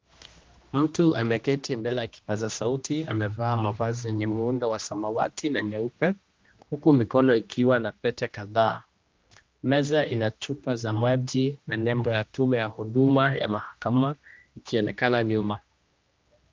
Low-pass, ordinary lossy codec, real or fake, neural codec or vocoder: 7.2 kHz; Opus, 24 kbps; fake; codec, 16 kHz, 1 kbps, X-Codec, HuBERT features, trained on general audio